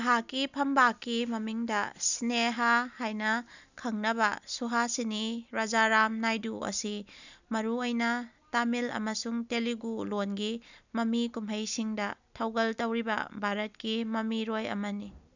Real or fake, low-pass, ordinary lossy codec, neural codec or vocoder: real; 7.2 kHz; none; none